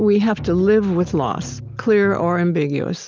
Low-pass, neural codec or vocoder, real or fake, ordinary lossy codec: 7.2 kHz; none; real; Opus, 24 kbps